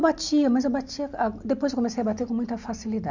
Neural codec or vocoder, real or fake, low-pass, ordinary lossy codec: none; real; 7.2 kHz; none